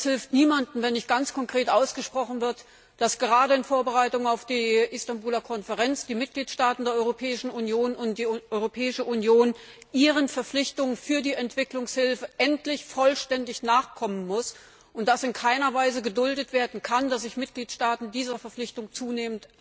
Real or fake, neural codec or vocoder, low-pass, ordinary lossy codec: real; none; none; none